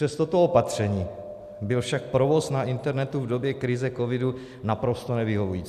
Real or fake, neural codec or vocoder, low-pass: real; none; 14.4 kHz